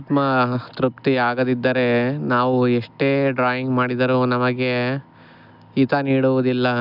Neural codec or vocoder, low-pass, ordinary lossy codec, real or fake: none; 5.4 kHz; none; real